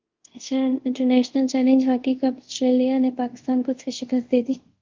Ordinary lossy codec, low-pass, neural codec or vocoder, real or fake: Opus, 32 kbps; 7.2 kHz; codec, 24 kHz, 0.5 kbps, DualCodec; fake